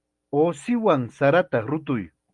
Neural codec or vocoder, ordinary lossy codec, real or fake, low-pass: none; Opus, 32 kbps; real; 10.8 kHz